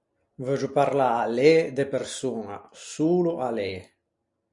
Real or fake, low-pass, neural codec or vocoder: real; 10.8 kHz; none